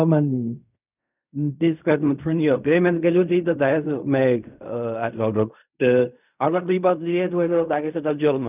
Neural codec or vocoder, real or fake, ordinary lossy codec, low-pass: codec, 16 kHz in and 24 kHz out, 0.4 kbps, LongCat-Audio-Codec, fine tuned four codebook decoder; fake; none; 3.6 kHz